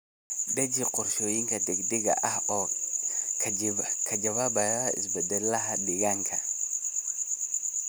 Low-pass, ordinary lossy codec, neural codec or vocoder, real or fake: none; none; none; real